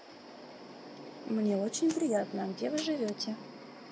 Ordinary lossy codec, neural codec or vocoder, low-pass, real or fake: none; none; none; real